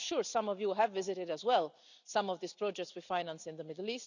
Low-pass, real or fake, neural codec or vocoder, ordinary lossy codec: 7.2 kHz; real; none; none